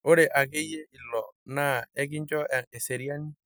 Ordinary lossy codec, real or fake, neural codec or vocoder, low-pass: none; real; none; none